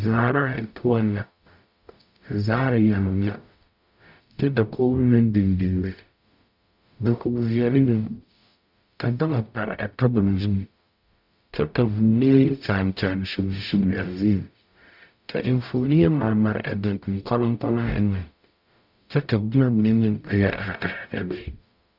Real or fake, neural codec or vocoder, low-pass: fake; codec, 44.1 kHz, 0.9 kbps, DAC; 5.4 kHz